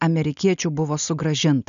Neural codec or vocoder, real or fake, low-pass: none; real; 7.2 kHz